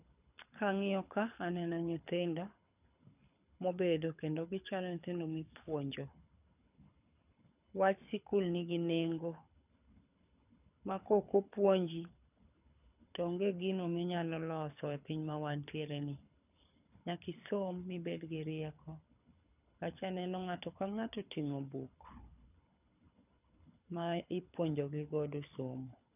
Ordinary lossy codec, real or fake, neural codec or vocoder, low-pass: none; fake; codec, 24 kHz, 6 kbps, HILCodec; 3.6 kHz